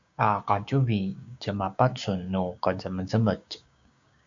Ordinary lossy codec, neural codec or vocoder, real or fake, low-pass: AAC, 64 kbps; codec, 16 kHz, 6 kbps, DAC; fake; 7.2 kHz